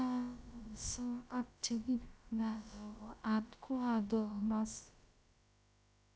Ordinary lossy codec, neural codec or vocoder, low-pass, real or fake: none; codec, 16 kHz, about 1 kbps, DyCAST, with the encoder's durations; none; fake